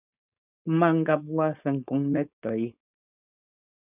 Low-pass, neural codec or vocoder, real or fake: 3.6 kHz; codec, 16 kHz, 4.8 kbps, FACodec; fake